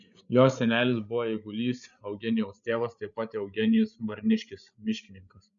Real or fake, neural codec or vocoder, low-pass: fake; codec, 16 kHz, 8 kbps, FreqCodec, larger model; 7.2 kHz